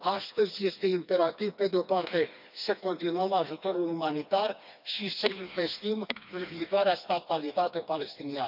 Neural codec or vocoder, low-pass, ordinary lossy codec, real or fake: codec, 16 kHz, 2 kbps, FreqCodec, smaller model; 5.4 kHz; none; fake